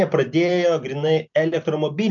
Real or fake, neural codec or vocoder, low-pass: real; none; 7.2 kHz